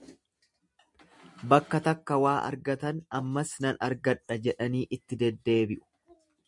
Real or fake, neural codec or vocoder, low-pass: real; none; 10.8 kHz